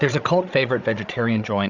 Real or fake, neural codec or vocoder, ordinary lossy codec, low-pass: fake; codec, 16 kHz, 16 kbps, FreqCodec, larger model; Opus, 64 kbps; 7.2 kHz